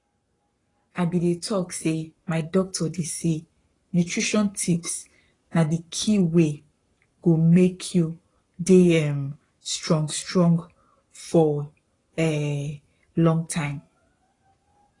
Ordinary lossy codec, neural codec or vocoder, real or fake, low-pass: AAC, 32 kbps; codec, 44.1 kHz, 7.8 kbps, Pupu-Codec; fake; 10.8 kHz